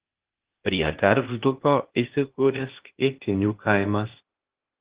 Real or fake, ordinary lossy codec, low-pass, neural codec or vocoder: fake; Opus, 16 kbps; 3.6 kHz; codec, 16 kHz, 0.8 kbps, ZipCodec